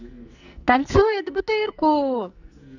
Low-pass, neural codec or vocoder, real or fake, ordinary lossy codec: 7.2 kHz; codec, 44.1 kHz, 2.6 kbps, SNAC; fake; none